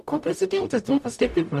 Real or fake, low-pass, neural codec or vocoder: fake; 14.4 kHz; codec, 44.1 kHz, 0.9 kbps, DAC